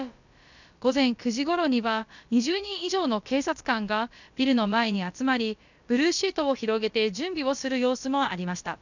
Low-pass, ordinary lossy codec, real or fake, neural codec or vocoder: 7.2 kHz; none; fake; codec, 16 kHz, about 1 kbps, DyCAST, with the encoder's durations